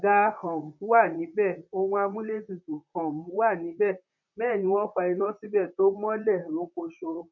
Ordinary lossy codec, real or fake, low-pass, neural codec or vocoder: none; fake; 7.2 kHz; vocoder, 44.1 kHz, 128 mel bands, Pupu-Vocoder